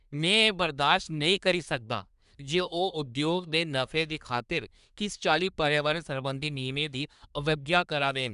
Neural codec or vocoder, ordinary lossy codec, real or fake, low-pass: codec, 24 kHz, 1 kbps, SNAC; none; fake; 10.8 kHz